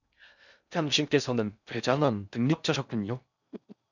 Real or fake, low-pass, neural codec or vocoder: fake; 7.2 kHz; codec, 16 kHz in and 24 kHz out, 0.6 kbps, FocalCodec, streaming, 4096 codes